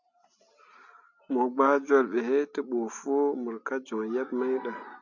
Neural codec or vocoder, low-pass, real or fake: none; 7.2 kHz; real